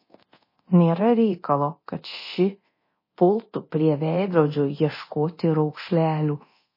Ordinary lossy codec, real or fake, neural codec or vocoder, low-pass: MP3, 24 kbps; fake; codec, 24 kHz, 0.9 kbps, DualCodec; 5.4 kHz